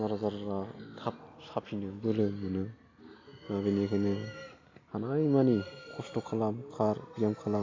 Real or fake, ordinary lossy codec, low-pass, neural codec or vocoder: real; AAC, 32 kbps; 7.2 kHz; none